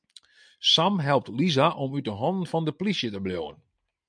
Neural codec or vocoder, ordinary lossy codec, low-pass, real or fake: none; MP3, 96 kbps; 9.9 kHz; real